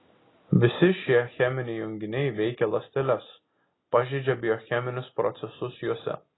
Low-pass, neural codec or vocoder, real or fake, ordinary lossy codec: 7.2 kHz; none; real; AAC, 16 kbps